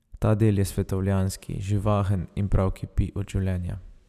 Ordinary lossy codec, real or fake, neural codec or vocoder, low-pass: none; real; none; 14.4 kHz